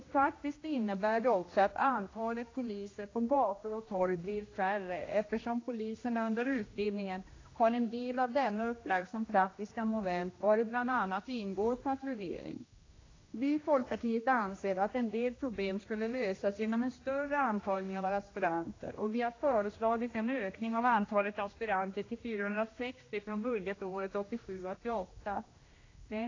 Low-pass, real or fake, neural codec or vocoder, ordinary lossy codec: 7.2 kHz; fake; codec, 16 kHz, 1 kbps, X-Codec, HuBERT features, trained on general audio; AAC, 32 kbps